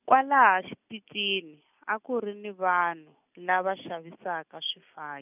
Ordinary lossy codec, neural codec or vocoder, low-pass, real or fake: none; none; 3.6 kHz; real